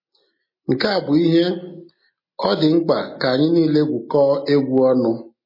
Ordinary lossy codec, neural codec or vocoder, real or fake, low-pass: MP3, 24 kbps; none; real; 5.4 kHz